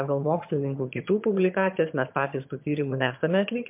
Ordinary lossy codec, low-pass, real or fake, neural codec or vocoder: MP3, 32 kbps; 3.6 kHz; fake; vocoder, 22.05 kHz, 80 mel bands, HiFi-GAN